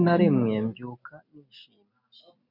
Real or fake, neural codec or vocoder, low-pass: real; none; 5.4 kHz